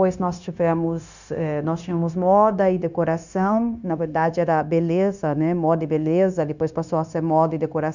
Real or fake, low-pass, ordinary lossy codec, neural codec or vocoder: fake; 7.2 kHz; none; codec, 16 kHz, 0.9 kbps, LongCat-Audio-Codec